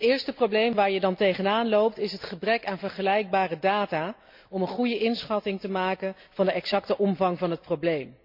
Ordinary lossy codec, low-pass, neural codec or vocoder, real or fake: MP3, 48 kbps; 5.4 kHz; none; real